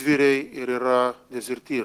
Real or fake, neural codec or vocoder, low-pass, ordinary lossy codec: real; none; 14.4 kHz; Opus, 16 kbps